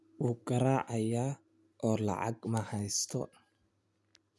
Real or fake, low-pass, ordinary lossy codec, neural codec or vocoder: real; none; none; none